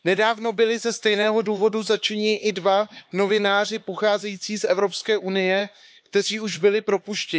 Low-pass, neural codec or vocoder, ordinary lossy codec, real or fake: none; codec, 16 kHz, 4 kbps, X-Codec, HuBERT features, trained on LibriSpeech; none; fake